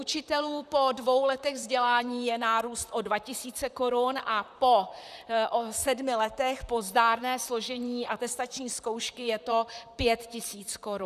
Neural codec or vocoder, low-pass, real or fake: vocoder, 44.1 kHz, 128 mel bands, Pupu-Vocoder; 14.4 kHz; fake